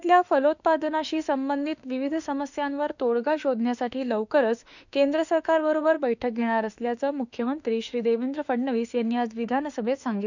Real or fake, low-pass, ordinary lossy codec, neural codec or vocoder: fake; 7.2 kHz; none; autoencoder, 48 kHz, 32 numbers a frame, DAC-VAE, trained on Japanese speech